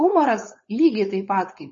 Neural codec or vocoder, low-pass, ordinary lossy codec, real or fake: codec, 16 kHz, 4.8 kbps, FACodec; 7.2 kHz; MP3, 32 kbps; fake